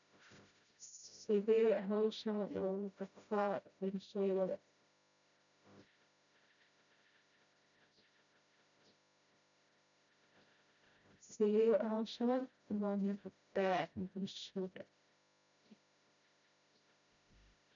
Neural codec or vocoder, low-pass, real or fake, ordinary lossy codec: codec, 16 kHz, 0.5 kbps, FreqCodec, smaller model; 7.2 kHz; fake; none